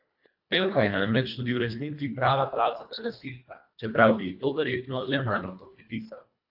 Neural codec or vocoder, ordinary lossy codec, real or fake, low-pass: codec, 24 kHz, 1.5 kbps, HILCodec; AAC, 48 kbps; fake; 5.4 kHz